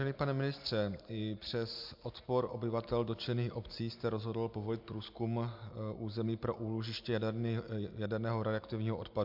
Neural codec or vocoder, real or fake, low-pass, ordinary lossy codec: none; real; 5.4 kHz; MP3, 48 kbps